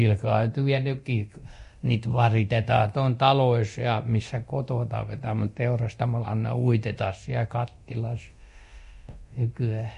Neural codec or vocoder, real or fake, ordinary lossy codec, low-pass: codec, 24 kHz, 0.9 kbps, DualCodec; fake; MP3, 48 kbps; 10.8 kHz